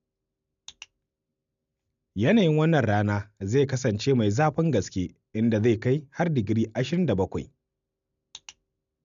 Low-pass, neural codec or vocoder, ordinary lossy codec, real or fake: 7.2 kHz; none; none; real